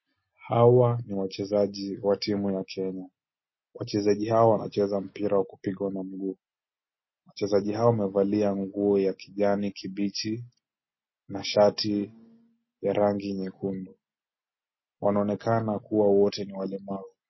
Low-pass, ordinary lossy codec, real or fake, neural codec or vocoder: 7.2 kHz; MP3, 24 kbps; real; none